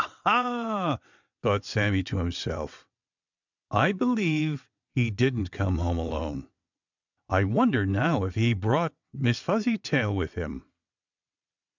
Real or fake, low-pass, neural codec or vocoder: fake; 7.2 kHz; vocoder, 22.05 kHz, 80 mel bands, WaveNeXt